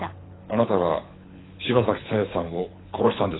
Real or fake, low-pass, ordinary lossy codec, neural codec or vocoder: fake; 7.2 kHz; AAC, 16 kbps; codec, 24 kHz, 6 kbps, HILCodec